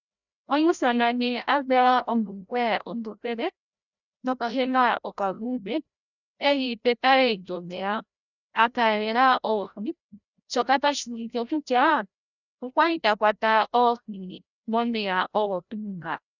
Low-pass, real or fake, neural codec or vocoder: 7.2 kHz; fake; codec, 16 kHz, 0.5 kbps, FreqCodec, larger model